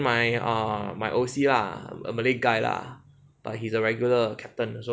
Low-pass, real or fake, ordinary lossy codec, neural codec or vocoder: none; real; none; none